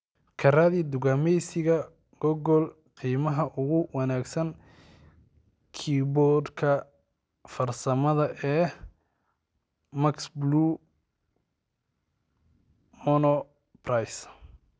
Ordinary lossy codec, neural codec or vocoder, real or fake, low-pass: none; none; real; none